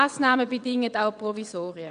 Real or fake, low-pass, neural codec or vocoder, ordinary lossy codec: fake; 9.9 kHz; vocoder, 22.05 kHz, 80 mel bands, WaveNeXt; none